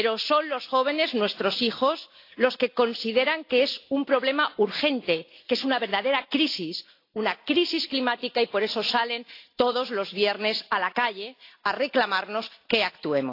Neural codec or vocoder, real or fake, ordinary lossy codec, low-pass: none; real; AAC, 32 kbps; 5.4 kHz